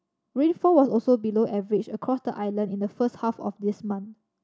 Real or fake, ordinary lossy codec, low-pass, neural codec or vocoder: real; none; none; none